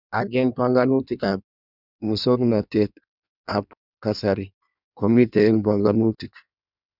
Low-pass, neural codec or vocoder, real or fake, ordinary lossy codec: 5.4 kHz; codec, 16 kHz in and 24 kHz out, 1.1 kbps, FireRedTTS-2 codec; fake; none